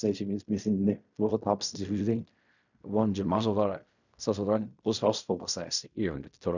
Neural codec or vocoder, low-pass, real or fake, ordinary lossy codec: codec, 16 kHz in and 24 kHz out, 0.4 kbps, LongCat-Audio-Codec, fine tuned four codebook decoder; 7.2 kHz; fake; none